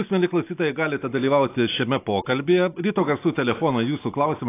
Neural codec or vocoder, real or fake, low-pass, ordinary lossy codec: none; real; 3.6 kHz; AAC, 24 kbps